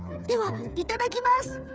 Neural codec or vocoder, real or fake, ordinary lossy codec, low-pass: codec, 16 kHz, 8 kbps, FreqCodec, smaller model; fake; none; none